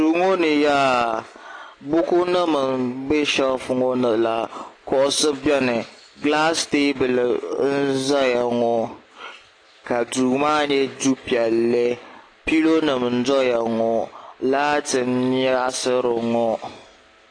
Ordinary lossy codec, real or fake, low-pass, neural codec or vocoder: AAC, 32 kbps; real; 9.9 kHz; none